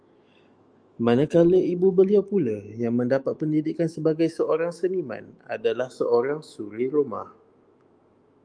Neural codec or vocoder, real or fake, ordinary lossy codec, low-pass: autoencoder, 48 kHz, 128 numbers a frame, DAC-VAE, trained on Japanese speech; fake; Opus, 32 kbps; 9.9 kHz